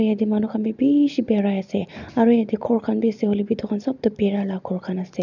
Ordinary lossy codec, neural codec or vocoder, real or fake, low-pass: none; none; real; 7.2 kHz